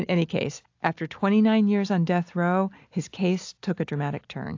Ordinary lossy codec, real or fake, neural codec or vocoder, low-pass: AAC, 48 kbps; real; none; 7.2 kHz